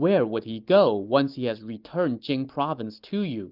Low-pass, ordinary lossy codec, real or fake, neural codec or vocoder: 5.4 kHz; Opus, 32 kbps; real; none